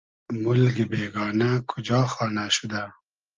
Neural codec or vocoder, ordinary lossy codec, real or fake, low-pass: none; Opus, 24 kbps; real; 7.2 kHz